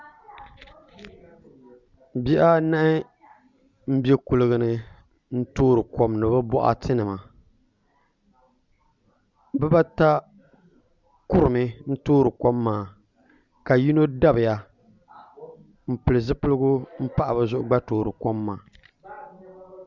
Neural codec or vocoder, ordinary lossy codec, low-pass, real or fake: none; Opus, 64 kbps; 7.2 kHz; real